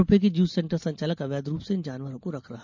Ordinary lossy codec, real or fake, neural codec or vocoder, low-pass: MP3, 64 kbps; real; none; 7.2 kHz